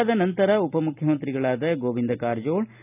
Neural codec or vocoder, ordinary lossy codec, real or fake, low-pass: none; none; real; 3.6 kHz